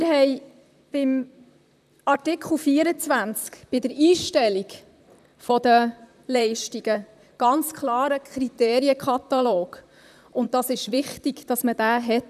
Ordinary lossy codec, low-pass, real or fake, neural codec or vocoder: none; 14.4 kHz; fake; vocoder, 44.1 kHz, 128 mel bands, Pupu-Vocoder